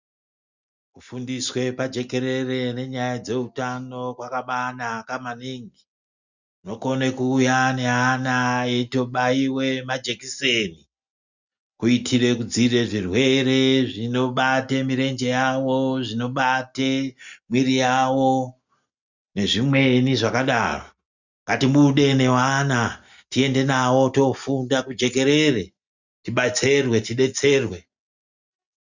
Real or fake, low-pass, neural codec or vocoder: real; 7.2 kHz; none